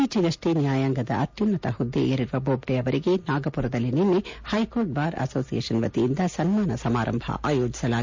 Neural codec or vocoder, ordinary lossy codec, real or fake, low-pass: none; MP3, 64 kbps; real; 7.2 kHz